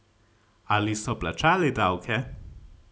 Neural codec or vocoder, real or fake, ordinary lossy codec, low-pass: none; real; none; none